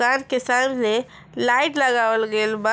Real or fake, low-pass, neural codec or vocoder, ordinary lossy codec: real; none; none; none